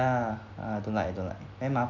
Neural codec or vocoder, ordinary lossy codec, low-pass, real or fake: none; none; 7.2 kHz; real